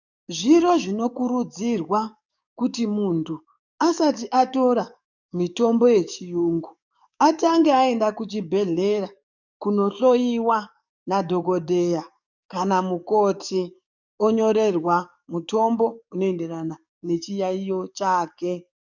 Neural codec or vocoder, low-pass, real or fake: codec, 44.1 kHz, 7.8 kbps, DAC; 7.2 kHz; fake